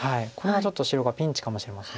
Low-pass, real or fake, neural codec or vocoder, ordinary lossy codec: none; real; none; none